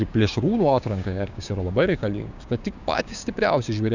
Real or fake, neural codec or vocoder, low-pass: fake; codec, 16 kHz, 6 kbps, DAC; 7.2 kHz